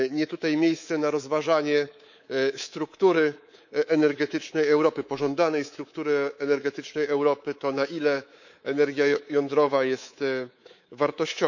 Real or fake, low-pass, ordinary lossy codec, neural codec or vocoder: fake; 7.2 kHz; none; codec, 24 kHz, 3.1 kbps, DualCodec